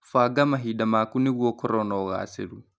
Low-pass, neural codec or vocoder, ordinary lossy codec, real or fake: none; none; none; real